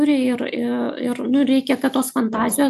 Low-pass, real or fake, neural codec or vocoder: 14.4 kHz; real; none